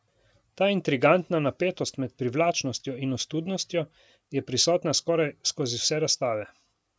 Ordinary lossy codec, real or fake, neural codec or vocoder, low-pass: none; real; none; none